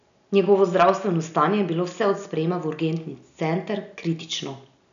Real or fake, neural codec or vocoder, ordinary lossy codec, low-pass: real; none; none; 7.2 kHz